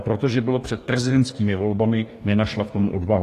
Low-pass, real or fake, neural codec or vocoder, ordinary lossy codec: 14.4 kHz; fake; codec, 44.1 kHz, 2.6 kbps, DAC; AAC, 48 kbps